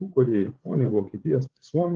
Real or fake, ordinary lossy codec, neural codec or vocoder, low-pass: fake; Opus, 16 kbps; codec, 16 kHz, 8 kbps, FreqCodec, larger model; 7.2 kHz